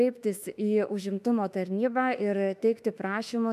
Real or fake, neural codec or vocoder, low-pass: fake; autoencoder, 48 kHz, 32 numbers a frame, DAC-VAE, trained on Japanese speech; 14.4 kHz